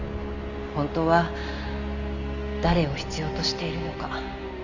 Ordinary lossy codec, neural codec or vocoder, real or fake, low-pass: none; none; real; 7.2 kHz